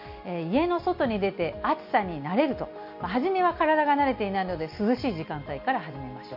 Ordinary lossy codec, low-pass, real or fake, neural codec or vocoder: none; 5.4 kHz; real; none